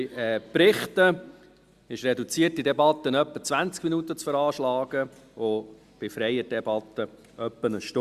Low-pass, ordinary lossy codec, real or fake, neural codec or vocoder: 14.4 kHz; Opus, 64 kbps; real; none